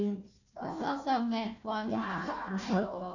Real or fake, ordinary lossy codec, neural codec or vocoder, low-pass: fake; none; codec, 16 kHz, 1 kbps, FunCodec, trained on Chinese and English, 50 frames a second; 7.2 kHz